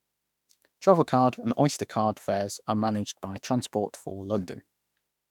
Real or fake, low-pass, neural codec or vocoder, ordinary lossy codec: fake; 19.8 kHz; autoencoder, 48 kHz, 32 numbers a frame, DAC-VAE, trained on Japanese speech; none